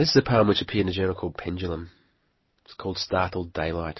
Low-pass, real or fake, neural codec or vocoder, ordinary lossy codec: 7.2 kHz; real; none; MP3, 24 kbps